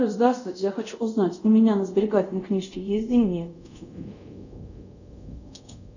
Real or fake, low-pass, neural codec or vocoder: fake; 7.2 kHz; codec, 24 kHz, 0.5 kbps, DualCodec